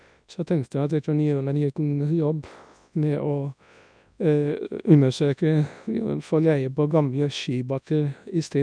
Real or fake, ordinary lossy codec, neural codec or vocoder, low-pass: fake; none; codec, 24 kHz, 0.9 kbps, WavTokenizer, large speech release; 9.9 kHz